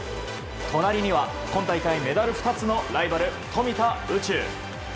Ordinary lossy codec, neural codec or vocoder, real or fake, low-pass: none; none; real; none